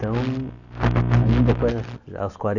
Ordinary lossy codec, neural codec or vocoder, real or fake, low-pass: AAC, 48 kbps; none; real; 7.2 kHz